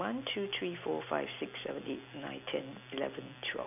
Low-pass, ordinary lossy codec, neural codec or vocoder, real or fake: 3.6 kHz; none; none; real